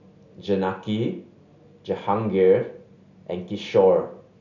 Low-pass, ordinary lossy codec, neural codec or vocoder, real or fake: 7.2 kHz; none; none; real